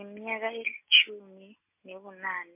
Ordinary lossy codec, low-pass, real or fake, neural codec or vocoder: MP3, 24 kbps; 3.6 kHz; real; none